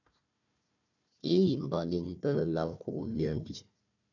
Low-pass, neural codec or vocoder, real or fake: 7.2 kHz; codec, 16 kHz, 1 kbps, FunCodec, trained on Chinese and English, 50 frames a second; fake